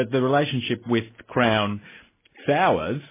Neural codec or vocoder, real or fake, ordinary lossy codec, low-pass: none; real; MP3, 16 kbps; 3.6 kHz